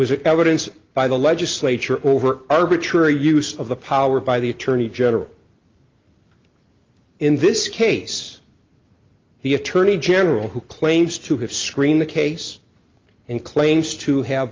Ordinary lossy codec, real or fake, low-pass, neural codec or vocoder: Opus, 32 kbps; real; 7.2 kHz; none